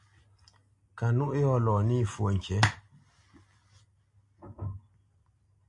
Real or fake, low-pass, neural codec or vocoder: real; 10.8 kHz; none